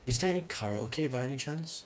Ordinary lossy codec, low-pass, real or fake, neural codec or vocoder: none; none; fake; codec, 16 kHz, 2 kbps, FreqCodec, smaller model